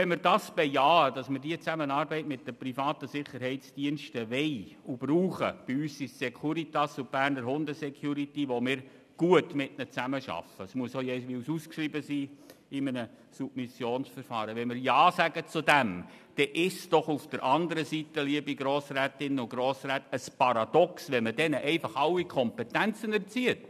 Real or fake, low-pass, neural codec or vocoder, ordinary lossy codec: real; 14.4 kHz; none; none